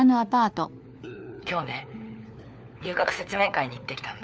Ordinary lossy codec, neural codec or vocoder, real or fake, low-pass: none; codec, 16 kHz, 4 kbps, FunCodec, trained on LibriTTS, 50 frames a second; fake; none